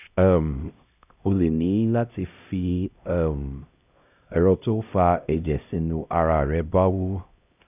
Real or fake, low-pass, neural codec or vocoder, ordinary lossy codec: fake; 3.6 kHz; codec, 16 kHz, 0.5 kbps, X-Codec, HuBERT features, trained on LibriSpeech; none